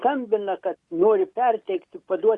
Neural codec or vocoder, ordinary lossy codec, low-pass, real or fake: none; Opus, 64 kbps; 10.8 kHz; real